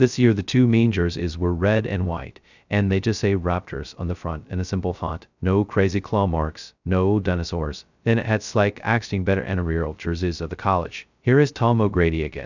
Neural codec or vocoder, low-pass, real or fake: codec, 16 kHz, 0.2 kbps, FocalCodec; 7.2 kHz; fake